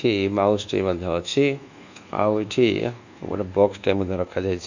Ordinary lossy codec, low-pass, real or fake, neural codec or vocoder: none; 7.2 kHz; fake; codec, 24 kHz, 1.2 kbps, DualCodec